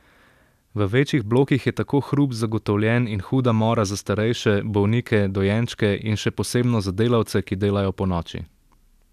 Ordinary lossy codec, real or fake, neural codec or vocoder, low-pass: none; real; none; 14.4 kHz